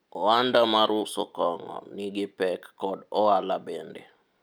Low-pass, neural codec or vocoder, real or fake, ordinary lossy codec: none; none; real; none